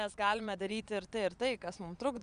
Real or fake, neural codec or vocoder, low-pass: fake; vocoder, 22.05 kHz, 80 mel bands, Vocos; 9.9 kHz